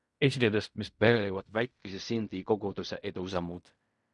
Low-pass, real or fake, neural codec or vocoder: 10.8 kHz; fake; codec, 16 kHz in and 24 kHz out, 0.4 kbps, LongCat-Audio-Codec, fine tuned four codebook decoder